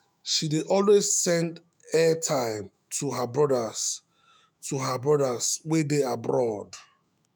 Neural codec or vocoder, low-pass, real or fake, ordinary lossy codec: autoencoder, 48 kHz, 128 numbers a frame, DAC-VAE, trained on Japanese speech; none; fake; none